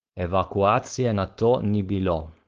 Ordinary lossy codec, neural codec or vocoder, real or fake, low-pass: Opus, 16 kbps; codec, 16 kHz, 4.8 kbps, FACodec; fake; 7.2 kHz